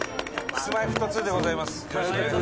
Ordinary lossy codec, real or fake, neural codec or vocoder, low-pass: none; real; none; none